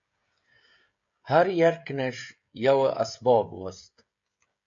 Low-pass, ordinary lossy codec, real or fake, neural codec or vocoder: 7.2 kHz; MP3, 48 kbps; fake; codec, 16 kHz, 16 kbps, FreqCodec, smaller model